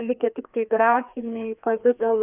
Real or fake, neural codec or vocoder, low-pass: fake; codec, 16 kHz, 2 kbps, FreqCodec, larger model; 3.6 kHz